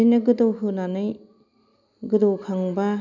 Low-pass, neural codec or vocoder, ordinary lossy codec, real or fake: 7.2 kHz; none; none; real